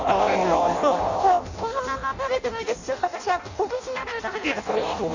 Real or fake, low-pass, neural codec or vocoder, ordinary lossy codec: fake; 7.2 kHz; codec, 16 kHz in and 24 kHz out, 0.6 kbps, FireRedTTS-2 codec; none